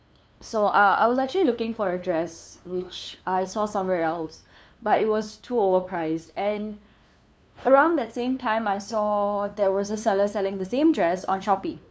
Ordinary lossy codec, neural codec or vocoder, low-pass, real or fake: none; codec, 16 kHz, 2 kbps, FunCodec, trained on LibriTTS, 25 frames a second; none; fake